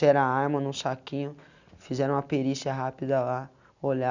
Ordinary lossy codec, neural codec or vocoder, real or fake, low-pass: none; none; real; 7.2 kHz